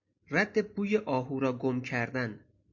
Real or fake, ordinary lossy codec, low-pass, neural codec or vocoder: real; MP3, 48 kbps; 7.2 kHz; none